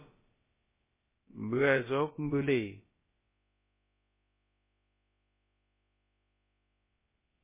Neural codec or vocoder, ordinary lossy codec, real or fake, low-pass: codec, 16 kHz, about 1 kbps, DyCAST, with the encoder's durations; MP3, 16 kbps; fake; 3.6 kHz